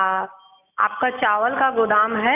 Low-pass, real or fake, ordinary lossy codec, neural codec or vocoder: 3.6 kHz; real; none; none